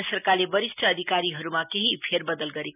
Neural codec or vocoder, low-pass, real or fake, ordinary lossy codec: none; 3.6 kHz; real; none